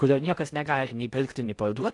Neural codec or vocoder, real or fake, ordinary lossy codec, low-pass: codec, 16 kHz in and 24 kHz out, 0.8 kbps, FocalCodec, streaming, 65536 codes; fake; AAC, 48 kbps; 10.8 kHz